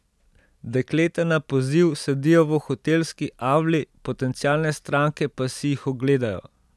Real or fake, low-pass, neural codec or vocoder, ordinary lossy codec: real; none; none; none